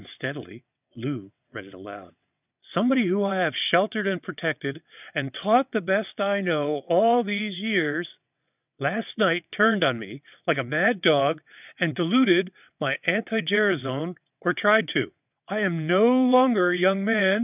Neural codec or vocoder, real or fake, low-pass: vocoder, 22.05 kHz, 80 mel bands, WaveNeXt; fake; 3.6 kHz